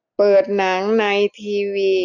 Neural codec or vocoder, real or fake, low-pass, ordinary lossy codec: none; real; 7.2 kHz; none